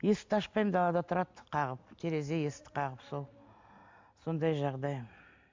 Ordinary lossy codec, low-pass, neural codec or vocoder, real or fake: MP3, 64 kbps; 7.2 kHz; none; real